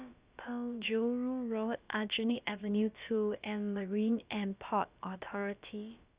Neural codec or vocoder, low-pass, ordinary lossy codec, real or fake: codec, 16 kHz, about 1 kbps, DyCAST, with the encoder's durations; 3.6 kHz; Opus, 64 kbps; fake